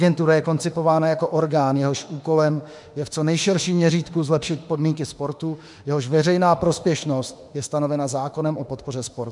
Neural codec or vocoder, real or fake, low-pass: autoencoder, 48 kHz, 32 numbers a frame, DAC-VAE, trained on Japanese speech; fake; 10.8 kHz